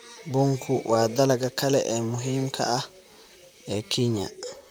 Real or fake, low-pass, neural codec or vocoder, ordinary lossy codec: fake; none; vocoder, 44.1 kHz, 128 mel bands, Pupu-Vocoder; none